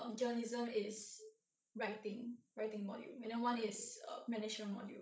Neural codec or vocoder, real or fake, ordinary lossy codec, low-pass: codec, 16 kHz, 16 kbps, FreqCodec, larger model; fake; none; none